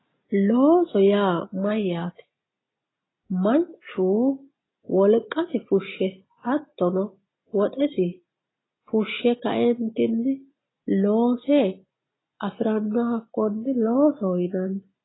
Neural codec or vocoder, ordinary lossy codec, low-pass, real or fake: none; AAC, 16 kbps; 7.2 kHz; real